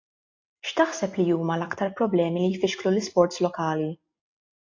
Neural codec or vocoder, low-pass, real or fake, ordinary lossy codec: none; 7.2 kHz; real; AAC, 48 kbps